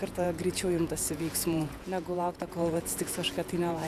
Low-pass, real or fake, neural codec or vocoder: 14.4 kHz; fake; vocoder, 48 kHz, 128 mel bands, Vocos